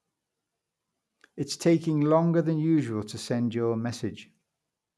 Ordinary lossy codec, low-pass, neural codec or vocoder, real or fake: none; none; none; real